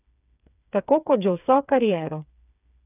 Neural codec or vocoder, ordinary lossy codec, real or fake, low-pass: codec, 16 kHz, 4 kbps, FreqCodec, smaller model; none; fake; 3.6 kHz